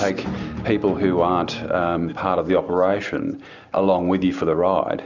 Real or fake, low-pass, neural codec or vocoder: real; 7.2 kHz; none